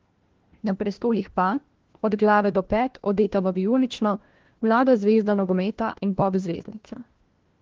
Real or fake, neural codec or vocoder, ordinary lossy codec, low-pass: fake; codec, 16 kHz, 1 kbps, FunCodec, trained on LibriTTS, 50 frames a second; Opus, 16 kbps; 7.2 kHz